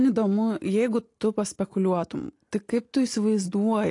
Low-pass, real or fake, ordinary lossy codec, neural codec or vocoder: 10.8 kHz; real; AAC, 48 kbps; none